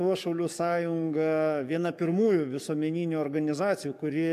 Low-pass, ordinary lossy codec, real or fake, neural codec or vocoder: 14.4 kHz; MP3, 96 kbps; fake; codec, 44.1 kHz, 7.8 kbps, DAC